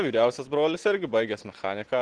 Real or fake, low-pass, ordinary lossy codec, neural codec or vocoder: real; 9.9 kHz; Opus, 16 kbps; none